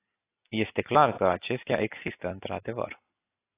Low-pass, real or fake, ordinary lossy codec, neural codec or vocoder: 3.6 kHz; real; AAC, 16 kbps; none